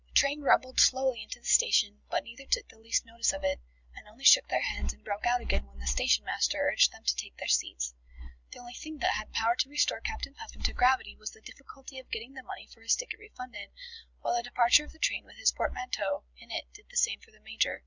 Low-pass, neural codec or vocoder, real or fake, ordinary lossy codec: 7.2 kHz; none; real; Opus, 64 kbps